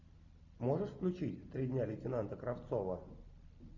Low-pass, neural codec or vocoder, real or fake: 7.2 kHz; none; real